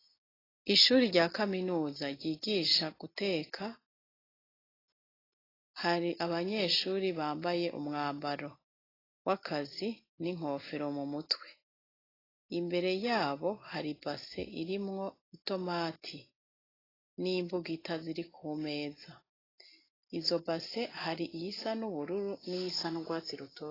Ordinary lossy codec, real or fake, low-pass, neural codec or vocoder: AAC, 24 kbps; real; 5.4 kHz; none